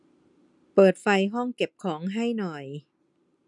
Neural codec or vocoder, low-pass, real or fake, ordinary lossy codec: none; 10.8 kHz; real; none